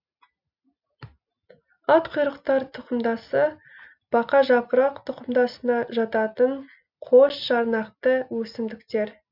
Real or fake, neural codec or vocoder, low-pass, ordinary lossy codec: real; none; 5.4 kHz; none